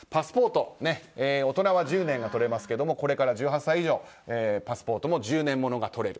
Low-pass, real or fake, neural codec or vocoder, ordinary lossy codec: none; real; none; none